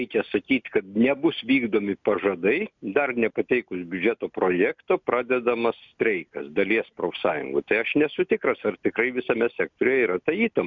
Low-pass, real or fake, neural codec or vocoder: 7.2 kHz; real; none